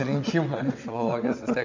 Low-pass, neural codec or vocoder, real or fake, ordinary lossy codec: 7.2 kHz; vocoder, 22.05 kHz, 80 mel bands, WaveNeXt; fake; MP3, 64 kbps